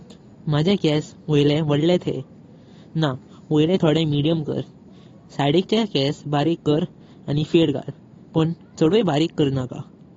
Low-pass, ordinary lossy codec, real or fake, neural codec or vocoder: 7.2 kHz; AAC, 32 kbps; real; none